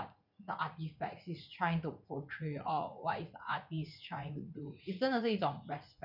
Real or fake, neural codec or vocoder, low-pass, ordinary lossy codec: fake; vocoder, 44.1 kHz, 80 mel bands, Vocos; 5.4 kHz; none